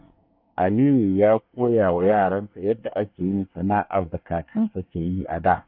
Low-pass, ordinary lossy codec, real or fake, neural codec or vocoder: 5.4 kHz; none; fake; codec, 24 kHz, 1 kbps, SNAC